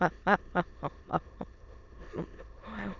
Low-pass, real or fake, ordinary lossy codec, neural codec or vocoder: 7.2 kHz; fake; none; autoencoder, 22.05 kHz, a latent of 192 numbers a frame, VITS, trained on many speakers